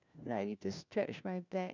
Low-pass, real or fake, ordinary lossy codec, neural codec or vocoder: 7.2 kHz; fake; none; codec, 16 kHz, 1 kbps, FunCodec, trained on LibriTTS, 50 frames a second